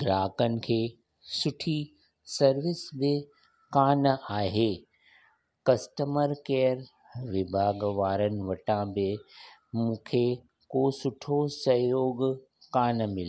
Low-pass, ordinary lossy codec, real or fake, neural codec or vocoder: none; none; real; none